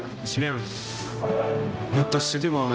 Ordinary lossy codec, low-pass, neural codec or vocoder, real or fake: none; none; codec, 16 kHz, 0.5 kbps, X-Codec, HuBERT features, trained on general audio; fake